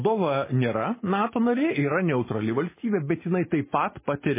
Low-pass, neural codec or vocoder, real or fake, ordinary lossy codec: 3.6 kHz; none; real; MP3, 16 kbps